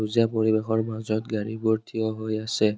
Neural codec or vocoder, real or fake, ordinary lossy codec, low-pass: none; real; none; none